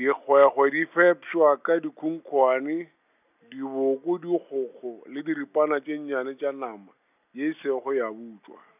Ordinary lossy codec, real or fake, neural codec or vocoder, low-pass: none; real; none; 3.6 kHz